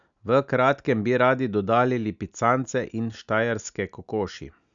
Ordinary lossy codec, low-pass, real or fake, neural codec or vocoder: none; 7.2 kHz; real; none